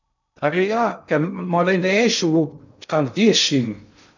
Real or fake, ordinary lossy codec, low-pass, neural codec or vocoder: fake; none; 7.2 kHz; codec, 16 kHz in and 24 kHz out, 0.6 kbps, FocalCodec, streaming, 2048 codes